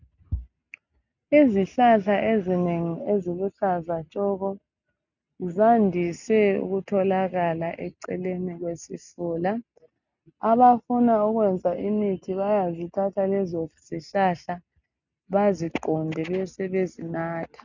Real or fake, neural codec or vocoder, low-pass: real; none; 7.2 kHz